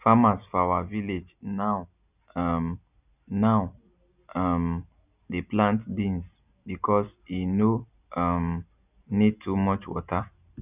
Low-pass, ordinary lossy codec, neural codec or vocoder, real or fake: 3.6 kHz; none; none; real